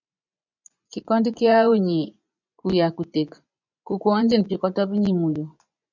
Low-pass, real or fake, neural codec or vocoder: 7.2 kHz; fake; vocoder, 24 kHz, 100 mel bands, Vocos